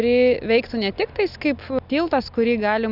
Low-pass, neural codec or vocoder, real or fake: 5.4 kHz; none; real